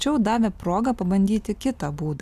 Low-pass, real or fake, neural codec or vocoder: 14.4 kHz; real; none